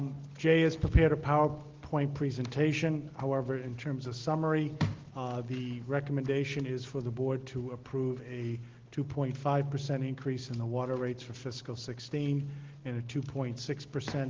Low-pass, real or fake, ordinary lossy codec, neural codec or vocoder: 7.2 kHz; real; Opus, 16 kbps; none